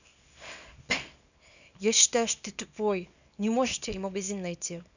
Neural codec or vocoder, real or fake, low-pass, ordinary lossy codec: codec, 24 kHz, 0.9 kbps, WavTokenizer, small release; fake; 7.2 kHz; none